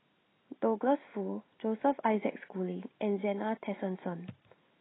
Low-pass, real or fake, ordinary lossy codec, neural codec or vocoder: 7.2 kHz; real; AAC, 16 kbps; none